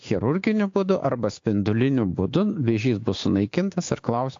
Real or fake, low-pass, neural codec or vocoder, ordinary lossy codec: fake; 7.2 kHz; codec, 16 kHz, 6 kbps, DAC; AAC, 48 kbps